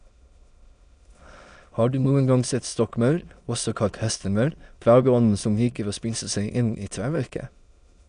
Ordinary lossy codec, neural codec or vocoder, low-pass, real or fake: none; autoencoder, 22.05 kHz, a latent of 192 numbers a frame, VITS, trained on many speakers; 9.9 kHz; fake